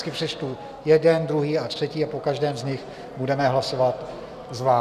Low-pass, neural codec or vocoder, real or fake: 14.4 kHz; none; real